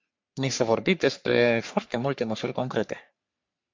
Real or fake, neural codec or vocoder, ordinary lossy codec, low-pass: fake; codec, 44.1 kHz, 3.4 kbps, Pupu-Codec; MP3, 64 kbps; 7.2 kHz